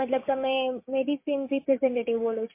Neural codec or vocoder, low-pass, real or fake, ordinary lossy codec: codec, 16 kHz, 6 kbps, DAC; 3.6 kHz; fake; MP3, 24 kbps